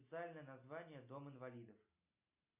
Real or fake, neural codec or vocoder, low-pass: real; none; 3.6 kHz